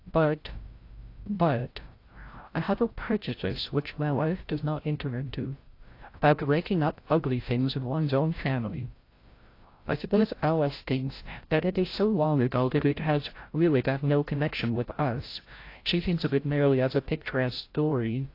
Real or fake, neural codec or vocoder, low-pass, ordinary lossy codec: fake; codec, 16 kHz, 0.5 kbps, FreqCodec, larger model; 5.4 kHz; AAC, 32 kbps